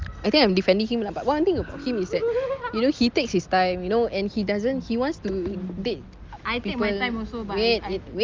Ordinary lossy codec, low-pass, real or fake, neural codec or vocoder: Opus, 32 kbps; 7.2 kHz; real; none